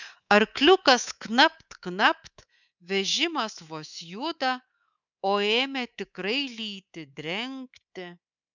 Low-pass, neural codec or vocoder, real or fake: 7.2 kHz; codec, 24 kHz, 3.1 kbps, DualCodec; fake